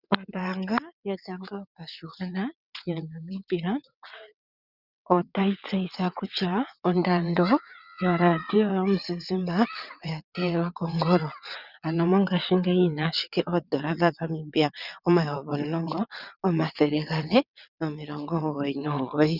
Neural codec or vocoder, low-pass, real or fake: vocoder, 22.05 kHz, 80 mel bands, WaveNeXt; 5.4 kHz; fake